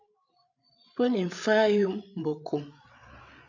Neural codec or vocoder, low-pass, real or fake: codec, 16 kHz, 8 kbps, FreqCodec, larger model; 7.2 kHz; fake